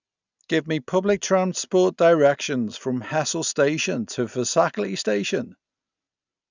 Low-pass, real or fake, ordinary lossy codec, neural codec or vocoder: 7.2 kHz; real; none; none